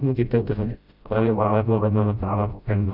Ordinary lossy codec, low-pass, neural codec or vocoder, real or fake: none; 5.4 kHz; codec, 16 kHz, 0.5 kbps, FreqCodec, smaller model; fake